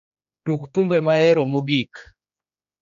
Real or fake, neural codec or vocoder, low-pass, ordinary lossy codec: fake; codec, 16 kHz, 2 kbps, X-Codec, HuBERT features, trained on general audio; 7.2 kHz; none